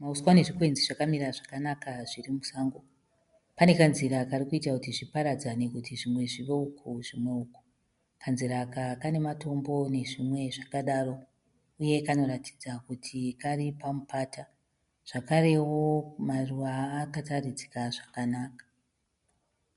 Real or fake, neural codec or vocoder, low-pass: real; none; 10.8 kHz